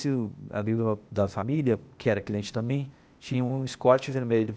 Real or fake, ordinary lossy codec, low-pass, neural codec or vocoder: fake; none; none; codec, 16 kHz, 0.8 kbps, ZipCodec